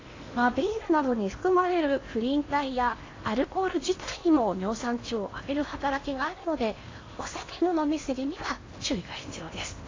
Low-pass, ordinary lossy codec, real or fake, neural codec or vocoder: 7.2 kHz; AAC, 32 kbps; fake; codec, 16 kHz in and 24 kHz out, 0.8 kbps, FocalCodec, streaming, 65536 codes